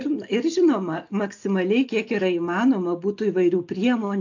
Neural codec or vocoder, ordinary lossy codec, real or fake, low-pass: none; AAC, 48 kbps; real; 7.2 kHz